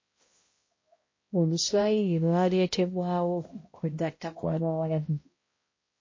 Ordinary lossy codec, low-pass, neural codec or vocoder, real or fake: MP3, 32 kbps; 7.2 kHz; codec, 16 kHz, 0.5 kbps, X-Codec, HuBERT features, trained on balanced general audio; fake